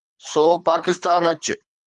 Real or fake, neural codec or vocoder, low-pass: fake; codec, 24 kHz, 3 kbps, HILCodec; 10.8 kHz